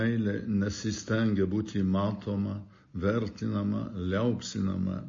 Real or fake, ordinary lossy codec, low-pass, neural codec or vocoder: real; MP3, 32 kbps; 7.2 kHz; none